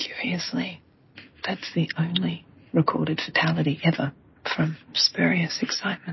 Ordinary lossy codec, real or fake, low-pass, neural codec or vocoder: MP3, 24 kbps; real; 7.2 kHz; none